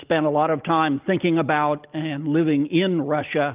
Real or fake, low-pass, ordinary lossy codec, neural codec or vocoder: real; 3.6 kHz; Opus, 24 kbps; none